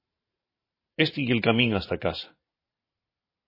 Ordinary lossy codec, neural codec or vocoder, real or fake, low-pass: MP3, 24 kbps; vocoder, 44.1 kHz, 128 mel bands every 512 samples, BigVGAN v2; fake; 5.4 kHz